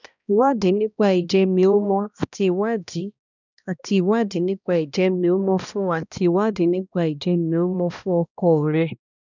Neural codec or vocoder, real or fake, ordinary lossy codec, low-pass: codec, 16 kHz, 1 kbps, X-Codec, HuBERT features, trained on balanced general audio; fake; none; 7.2 kHz